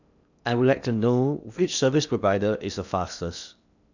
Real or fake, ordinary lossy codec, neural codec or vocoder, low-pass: fake; none; codec, 16 kHz in and 24 kHz out, 0.8 kbps, FocalCodec, streaming, 65536 codes; 7.2 kHz